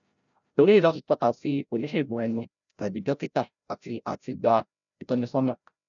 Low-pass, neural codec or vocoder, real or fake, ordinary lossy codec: 7.2 kHz; codec, 16 kHz, 0.5 kbps, FreqCodec, larger model; fake; none